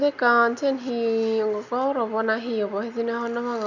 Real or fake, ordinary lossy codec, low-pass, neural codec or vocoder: real; none; 7.2 kHz; none